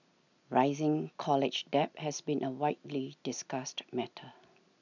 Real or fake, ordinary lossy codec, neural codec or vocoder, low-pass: real; none; none; 7.2 kHz